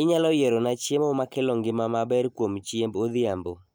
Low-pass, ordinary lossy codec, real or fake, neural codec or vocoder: none; none; real; none